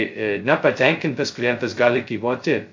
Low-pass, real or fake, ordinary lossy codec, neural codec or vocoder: 7.2 kHz; fake; MP3, 48 kbps; codec, 16 kHz, 0.2 kbps, FocalCodec